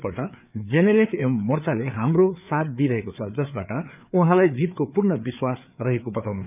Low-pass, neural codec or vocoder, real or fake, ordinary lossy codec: 3.6 kHz; codec, 16 kHz, 4 kbps, FreqCodec, larger model; fake; none